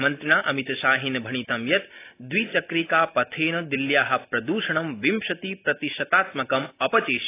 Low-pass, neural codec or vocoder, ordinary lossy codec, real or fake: 3.6 kHz; none; AAC, 24 kbps; real